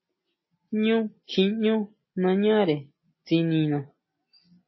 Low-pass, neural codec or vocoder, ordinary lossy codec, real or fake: 7.2 kHz; none; MP3, 24 kbps; real